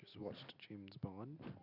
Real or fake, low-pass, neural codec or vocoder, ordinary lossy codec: real; 5.4 kHz; none; none